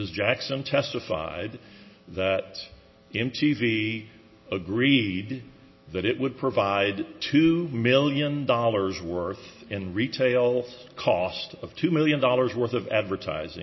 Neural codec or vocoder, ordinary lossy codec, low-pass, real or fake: none; MP3, 24 kbps; 7.2 kHz; real